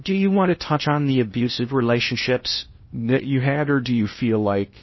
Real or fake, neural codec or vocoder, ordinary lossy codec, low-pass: fake; codec, 16 kHz in and 24 kHz out, 0.6 kbps, FocalCodec, streaming, 2048 codes; MP3, 24 kbps; 7.2 kHz